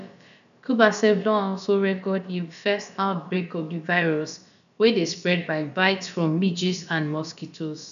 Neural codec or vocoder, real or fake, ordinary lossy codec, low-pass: codec, 16 kHz, about 1 kbps, DyCAST, with the encoder's durations; fake; none; 7.2 kHz